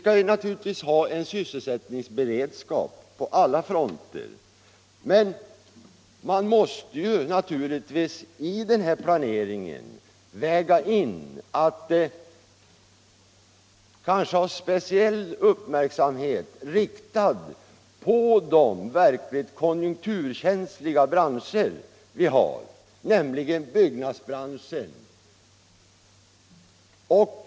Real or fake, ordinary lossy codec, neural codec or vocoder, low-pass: real; none; none; none